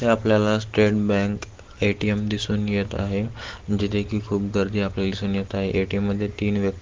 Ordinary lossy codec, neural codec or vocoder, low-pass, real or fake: Opus, 16 kbps; none; 7.2 kHz; real